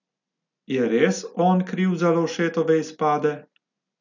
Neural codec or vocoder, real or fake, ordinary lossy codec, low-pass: none; real; none; 7.2 kHz